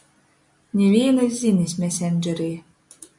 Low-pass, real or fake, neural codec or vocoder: 10.8 kHz; real; none